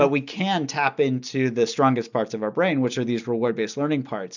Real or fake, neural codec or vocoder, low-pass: real; none; 7.2 kHz